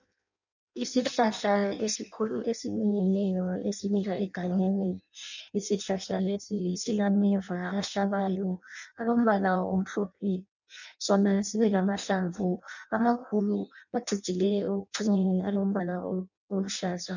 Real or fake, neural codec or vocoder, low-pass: fake; codec, 16 kHz in and 24 kHz out, 0.6 kbps, FireRedTTS-2 codec; 7.2 kHz